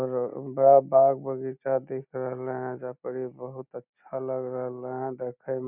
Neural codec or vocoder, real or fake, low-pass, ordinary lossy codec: none; real; 3.6 kHz; none